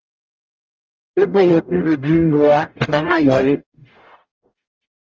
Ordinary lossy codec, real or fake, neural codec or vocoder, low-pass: Opus, 24 kbps; fake; codec, 44.1 kHz, 0.9 kbps, DAC; 7.2 kHz